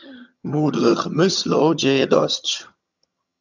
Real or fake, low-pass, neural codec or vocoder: fake; 7.2 kHz; vocoder, 22.05 kHz, 80 mel bands, HiFi-GAN